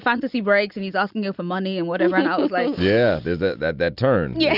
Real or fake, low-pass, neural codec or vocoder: real; 5.4 kHz; none